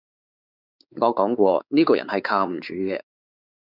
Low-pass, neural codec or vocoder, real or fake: 5.4 kHz; codec, 16 kHz, 4 kbps, X-Codec, WavLM features, trained on Multilingual LibriSpeech; fake